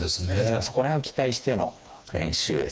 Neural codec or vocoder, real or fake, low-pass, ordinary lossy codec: codec, 16 kHz, 2 kbps, FreqCodec, smaller model; fake; none; none